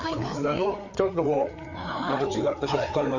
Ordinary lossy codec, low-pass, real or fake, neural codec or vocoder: none; 7.2 kHz; fake; codec, 16 kHz, 4 kbps, FreqCodec, larger model